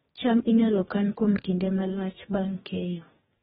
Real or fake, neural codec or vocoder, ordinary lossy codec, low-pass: fake; codec, 44.1 kHz, 2.6 kbps, DAC; AAC, 16 kbps; 19.8 kHz